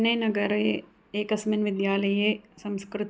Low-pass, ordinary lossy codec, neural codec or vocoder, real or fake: none; none; none; real